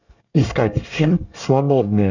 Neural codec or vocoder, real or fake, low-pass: codec, 24 kHz, 1 kbps, SNAC; fake; 7.2 kHz